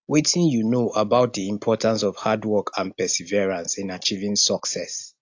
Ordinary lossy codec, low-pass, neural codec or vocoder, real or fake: AAC, 48 kbps; 7.2 kHz; none; real